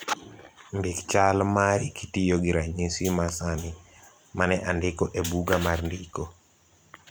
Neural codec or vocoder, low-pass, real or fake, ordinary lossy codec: vocoder, 44.1 kHz, 128 mel bands every 256 samples, BigVGAN v2; none; fake; none